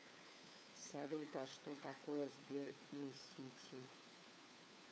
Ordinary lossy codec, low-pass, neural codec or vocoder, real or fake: none; none; codec, 16 kHz, 4 kbps, FunCodec, trained on LibriTTS, 50 frames a second; fake